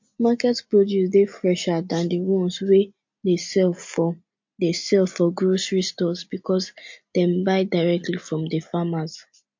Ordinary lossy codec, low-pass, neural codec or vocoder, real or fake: MP3, 48 kbps; 7.2 kHz; none; real